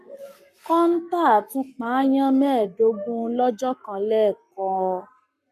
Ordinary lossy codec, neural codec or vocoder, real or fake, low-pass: none; codec, 44.1 kHz, 7.8 kbps, Pupu-Codec; fake; 14.4 kHz